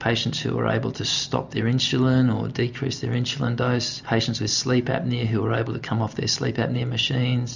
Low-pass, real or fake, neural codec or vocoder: 7.2 kHz; real; none